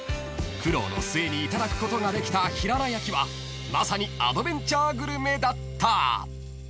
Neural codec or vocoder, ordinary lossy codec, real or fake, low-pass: none; none; real; none